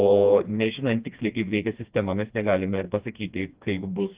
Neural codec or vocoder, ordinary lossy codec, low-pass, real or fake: codec, 16 kHz, 2 kbps, FreqCodec, smaller model; Opus, 32 kbps; 3.6 kHz; fake